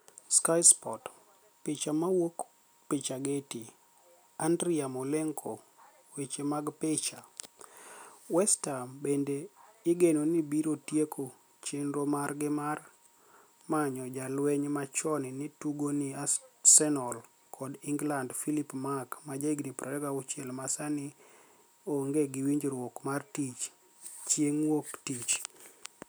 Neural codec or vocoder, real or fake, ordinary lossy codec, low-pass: none; real; none; none